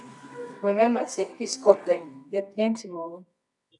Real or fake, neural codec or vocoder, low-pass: fake; codec, 24 kHz, 0.9 kbps, WavTokenizer, medium music audio release; 10.8 kHz